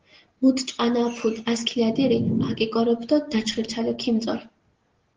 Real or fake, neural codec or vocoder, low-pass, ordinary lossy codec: real; none; 7.2 kHz; Opus, 16 kbps